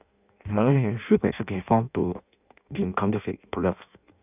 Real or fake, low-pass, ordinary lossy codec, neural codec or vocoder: fake; 3.6 kHz; none; codec, 16 kHz in and 24 kHz out, 0.6 kbps, FireRedTTS-2 codec